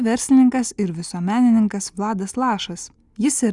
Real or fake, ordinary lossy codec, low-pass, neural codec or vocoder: real; Opus, 64 kbps; 10.8 kHz; none